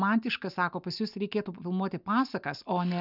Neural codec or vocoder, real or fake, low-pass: none; real; 5.4 kHz